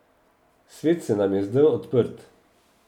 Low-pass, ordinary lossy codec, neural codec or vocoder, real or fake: 19.8 kHz; none; none; real